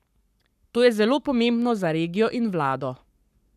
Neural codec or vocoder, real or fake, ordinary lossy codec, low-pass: codec, 44.1 kHz, 7.8 kbps, Pupu-Codec; fake; none; 14.4 kHz